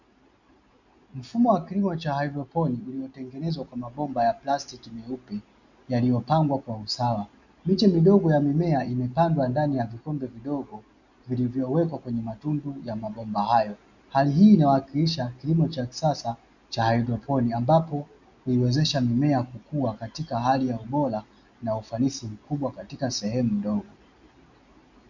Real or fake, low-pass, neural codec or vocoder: real; 7.2 kHz; none